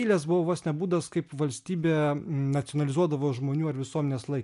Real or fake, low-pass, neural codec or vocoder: real; 10.8 kHz; none